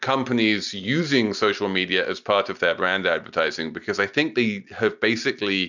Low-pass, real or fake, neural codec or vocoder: 7.2 kHz; real; none